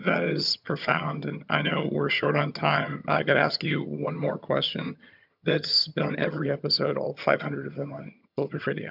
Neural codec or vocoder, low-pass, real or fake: vocoder, 22.05 kHz, 80 mel bands, HiFi-GAN; 5.4 kHz; fake